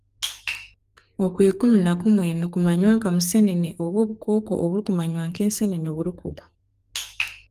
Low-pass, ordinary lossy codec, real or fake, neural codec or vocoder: 14.4 kHz; Opus, 32 kbps; fake; codec, 44.1 kHz, 2.6 kbps, SNAC